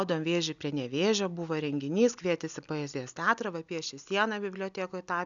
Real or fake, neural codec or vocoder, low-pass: real; none; 7.2 kHz